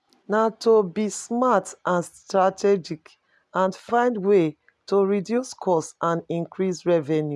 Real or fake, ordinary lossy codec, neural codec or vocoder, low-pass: real; none; none; none